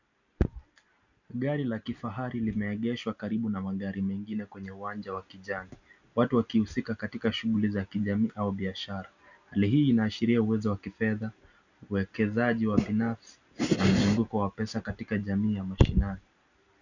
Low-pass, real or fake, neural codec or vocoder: 7.2 kHz; real; none